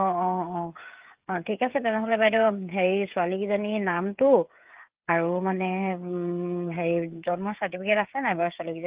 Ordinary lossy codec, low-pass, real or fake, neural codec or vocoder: Opus, 32 kbps; 3.6 kHz; fake; codec, 16 kHz, 8 kbps, FreqCodec, smaller model